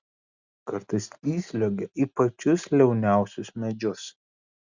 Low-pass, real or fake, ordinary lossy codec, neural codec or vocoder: 7.2 kHz; real; Opus, 64 kbps; none